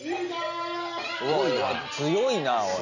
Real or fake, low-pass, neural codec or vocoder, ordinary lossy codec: real; 7.2 kHz; none; none